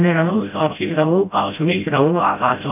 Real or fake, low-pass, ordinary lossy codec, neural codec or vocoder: fake; 3.6 kHz; MP3, 32 kbps; codec, 16 kHz, 0.5 kbps, FreqCodec, smaller model